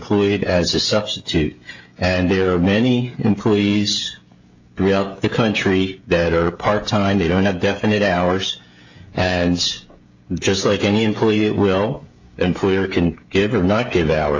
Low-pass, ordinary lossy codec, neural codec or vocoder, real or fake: 7.2 kHz; AAC, 48 kbps; codec, 16 kHz, 16 kbps, FreqCodec, smaller model; fake